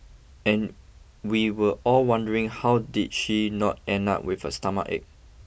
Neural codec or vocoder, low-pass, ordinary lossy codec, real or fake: none; none; none; real